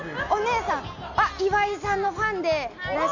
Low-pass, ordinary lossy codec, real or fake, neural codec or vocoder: 7.2 kHz; none; real; none